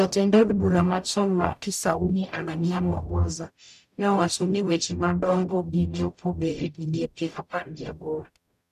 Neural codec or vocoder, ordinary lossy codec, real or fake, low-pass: codec, 44.1 kHz, 0.9 kbps, DAC; none; fake; 14.4 kHz